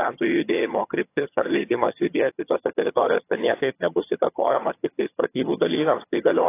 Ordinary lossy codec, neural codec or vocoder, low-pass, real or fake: AAC, 24 kbps; vocoder, 22.05 kHz, 80 mel bands, HiFi-GAN; 3.6 kHz; fake